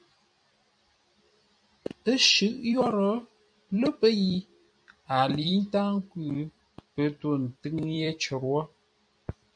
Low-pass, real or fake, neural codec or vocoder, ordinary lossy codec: 9.9 kHz; fake; vocoder, 44.1 kHz, 128 mel bands every 256 samples, BigVGAN v2; MP3, 64 kbps